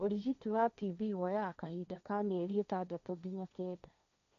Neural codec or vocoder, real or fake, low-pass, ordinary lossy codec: codec, 16 kHz, 1.1 kbps, Voila-Tokenizer; fake; 7.2 kHz; none